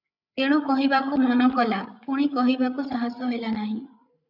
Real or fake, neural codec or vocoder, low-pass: fake; codec, 16 kHz, 8 kbps, FreqCodec, larger model; 5.4 kHz